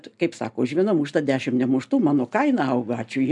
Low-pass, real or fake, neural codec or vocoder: 10.8 kHz; real; none